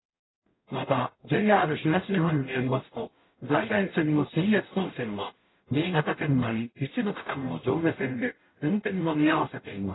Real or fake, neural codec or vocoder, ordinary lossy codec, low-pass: fake; codec, 44.1 kHz, 0.9 kbps, DAC; AAC, 16 kbps; 7.2 kHz